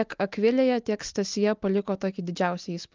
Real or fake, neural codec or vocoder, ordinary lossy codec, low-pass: real; none; Opus, 24 kbps; 7.2 kHz